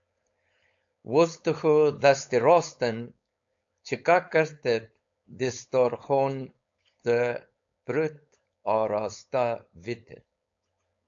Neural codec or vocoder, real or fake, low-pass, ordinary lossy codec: codec, 16 kHz, 4.8 kbps, FACodec; fake; 7.2 kHz; AAC, 64 kbps